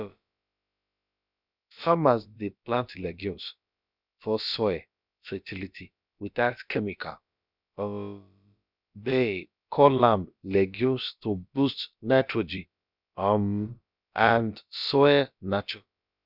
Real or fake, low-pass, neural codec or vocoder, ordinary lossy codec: fake; 5.4 kHz; codec, 16 kHz, about 1 kbps, DyCAST, with the encoder's durations; none